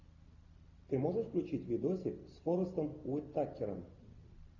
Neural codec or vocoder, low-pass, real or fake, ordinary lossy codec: none; 7.2 kHz; real; AAC, 48 kbps